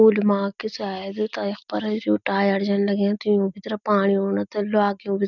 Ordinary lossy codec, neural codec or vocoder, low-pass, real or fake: none; none; 7.2 kHz; real